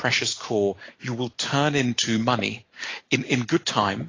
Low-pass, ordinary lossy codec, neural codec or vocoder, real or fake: 7.2 kHz; AAC, 32 kbps; none; real